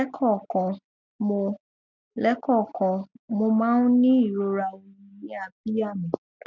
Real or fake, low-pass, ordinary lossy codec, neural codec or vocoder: real; 7.2 kHz; none; none